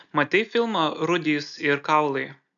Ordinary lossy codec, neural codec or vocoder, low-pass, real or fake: MP3, 96 kbps; none; 7.2 kHz; real